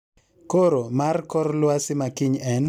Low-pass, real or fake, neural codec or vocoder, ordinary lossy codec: 19.8 kHz; real; none; none